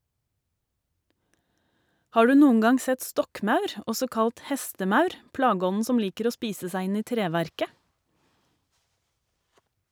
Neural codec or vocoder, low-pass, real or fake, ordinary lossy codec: none; none; real; none